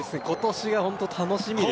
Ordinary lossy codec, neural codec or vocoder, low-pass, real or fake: none; none; none; real